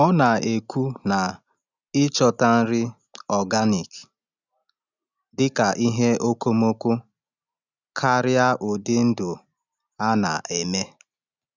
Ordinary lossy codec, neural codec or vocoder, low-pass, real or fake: none; none; 7.2 kHz; real